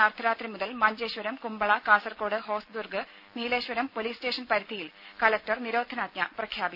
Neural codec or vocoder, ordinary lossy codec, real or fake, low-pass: none; none; real; 5.4 kHz